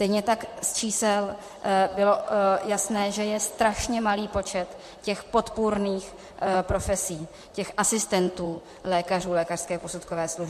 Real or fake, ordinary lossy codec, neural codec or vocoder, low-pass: fake; MP3, 64 kbps; vocoder, 44.1 kHz, 128 mel bands, Pupu-Vocoder; 14.4 kHz